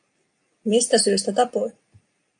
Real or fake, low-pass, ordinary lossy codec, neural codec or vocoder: real; 9.9 kHz; AAC, 64 kbps; none